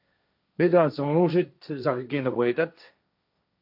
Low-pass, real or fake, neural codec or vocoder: 5.4 kHz; fake; codec, 16 kHz, 1.1 kbps, Voila-Tokenizer